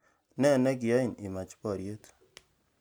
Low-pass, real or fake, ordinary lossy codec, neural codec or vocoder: none; real; none; none